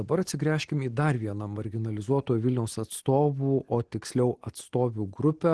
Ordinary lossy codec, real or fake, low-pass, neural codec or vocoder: Opus, 16 kbps; real; 10.8 kHz; none